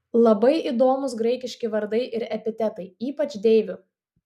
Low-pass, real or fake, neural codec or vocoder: 14.4 kHz; real; none